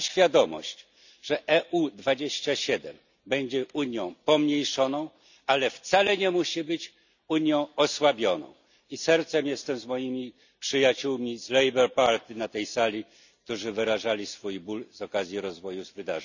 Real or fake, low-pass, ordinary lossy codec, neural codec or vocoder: real; 7.2 kHz; none; none